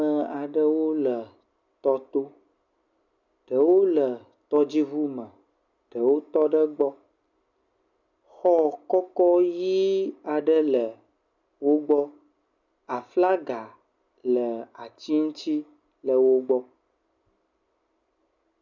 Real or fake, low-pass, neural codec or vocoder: real; 7.2 kHz; none